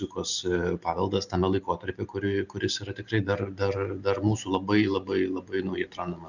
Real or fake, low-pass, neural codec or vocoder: real; 7.2 kHz; none